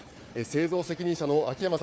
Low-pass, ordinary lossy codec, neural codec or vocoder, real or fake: none; none; codec, 16 kHz, 4 kbps, FunCodec, trained on Chinese and English, 50 frames a second; fake